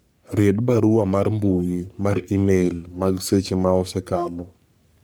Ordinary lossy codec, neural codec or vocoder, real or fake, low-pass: none; codec, 44.1 kHz, 3.4 kbps, Pupu-Codec; fake; none